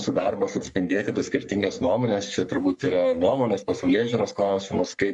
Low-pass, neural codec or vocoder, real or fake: 10.8 kHz; codec, 44.1 kHz, 3.4 kbps, Pupu-Codec; fake